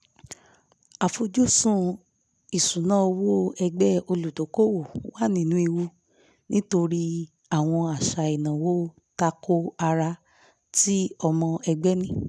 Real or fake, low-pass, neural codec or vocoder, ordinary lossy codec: real; none; none; none